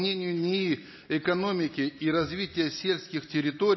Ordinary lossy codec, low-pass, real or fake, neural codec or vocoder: MP3, 24 kbps; 7.2 kHz; real; none